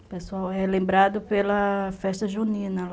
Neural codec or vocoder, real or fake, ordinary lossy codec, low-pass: none; real; none; none